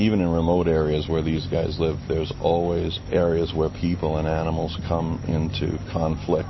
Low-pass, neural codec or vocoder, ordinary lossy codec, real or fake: 7.2 kHz; none; MP3, 24 kbps; real